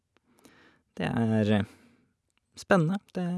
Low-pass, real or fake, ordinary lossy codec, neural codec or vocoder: none; real; none; none